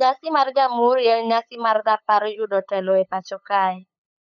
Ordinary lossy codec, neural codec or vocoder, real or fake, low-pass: none; codec, 16 kHz, 8 kbps, FunCodec, trained on LibriTTS, 25 frames a second; fake; 7.2 kHz